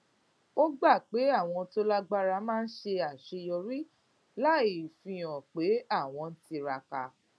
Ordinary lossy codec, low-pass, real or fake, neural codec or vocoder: none; none; real; none